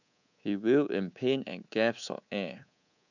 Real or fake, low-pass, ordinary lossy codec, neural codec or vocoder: fake; 7.2 kHz; none; codec, 24 kHz, 3.1 kbps, DualCodec